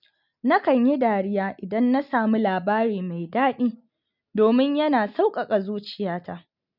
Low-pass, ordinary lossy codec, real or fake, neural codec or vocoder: 5.4 kHz; none; real; none